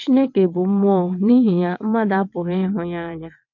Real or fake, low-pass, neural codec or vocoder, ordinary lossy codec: fake; 7.2 kHz; vocoder, 22.05 kHz, 80 mel bands, WaveNeXt; MP3, 48 kbps